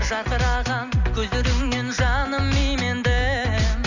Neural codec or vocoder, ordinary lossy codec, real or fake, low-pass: none; none; real; 7.2 kHz